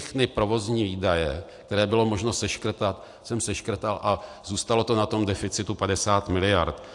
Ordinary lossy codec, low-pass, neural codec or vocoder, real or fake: AAC, 64 kbps; 10.8 kHz; none; real